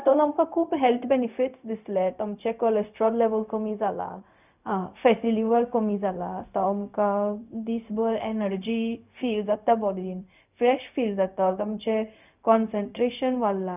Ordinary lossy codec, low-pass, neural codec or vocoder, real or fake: none; 3.6 kHz; codec, 16 kHz, 0.4 kbps, LongCat-Audio-Codec; fake